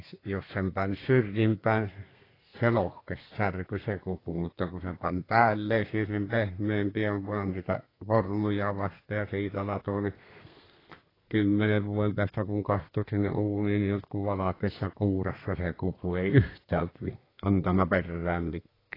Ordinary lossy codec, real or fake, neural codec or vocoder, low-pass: AAC, 24 kbps; fake; codec, 32 kHz, 1.9 kbps, SNAC; 5.4 kHz